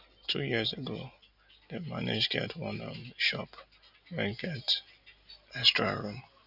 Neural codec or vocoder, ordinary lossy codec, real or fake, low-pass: none; none; real; 5.4 kHz